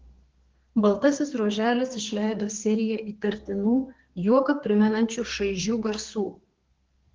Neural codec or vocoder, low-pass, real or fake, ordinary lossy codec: codec, 32 kHz, 1.9 kbps, SNAC; 7.2 kHz; fake; Opus, 16 kbps